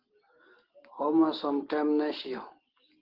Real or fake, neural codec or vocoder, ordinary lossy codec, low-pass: real; none; Opus, 16 kbps; 5.4 kHz